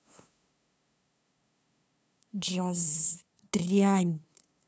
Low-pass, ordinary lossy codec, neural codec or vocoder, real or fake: none; none; codec, 16 kHz, 2 kbps, FunCodec, trained on LibriTTS, 25 frames a second; fake